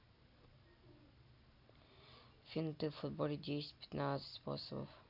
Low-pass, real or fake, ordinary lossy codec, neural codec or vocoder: 5.4 kHz; real; none; none